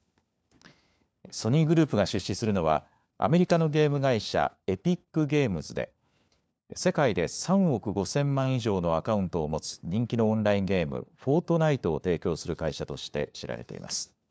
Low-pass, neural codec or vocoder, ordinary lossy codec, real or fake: none; codec, 16 kHz, 4 kbps, FunCodec, trained on LibriTTS, 50 frames a second; none; fake